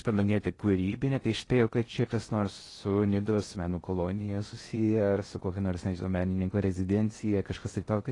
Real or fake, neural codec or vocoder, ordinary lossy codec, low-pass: fake; codec, 16 kHz in and 24 kHz out, 0.6 kbps, FocalCodec, streaming, 4096 codes; AAC, 32 kbps; 10.8 kHz